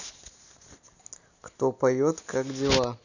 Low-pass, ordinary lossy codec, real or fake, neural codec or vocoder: 7.2 kHz; none; real; none